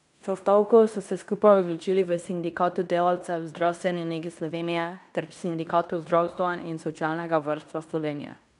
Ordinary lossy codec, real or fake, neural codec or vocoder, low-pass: none; fake; codec, 16 kHz in and 24 kHz out, 0.9 kbps, LongCat-Audio-Codec, fine tuned four codebook decoder; 10.8 kHz